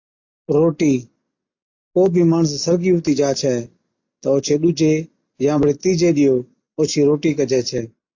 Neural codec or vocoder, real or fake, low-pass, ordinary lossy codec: none; real; 7.2 kHz; AAC, 48 kbps